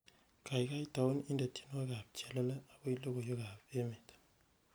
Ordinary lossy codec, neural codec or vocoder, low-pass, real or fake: none; none; none; real